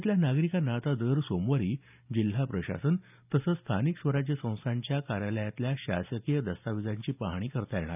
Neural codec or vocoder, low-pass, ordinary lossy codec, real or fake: none; 3.6 kHz; none; real